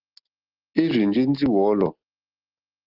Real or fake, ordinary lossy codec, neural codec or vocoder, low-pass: real; Opus, 16 kbps; none; 5.4 kHz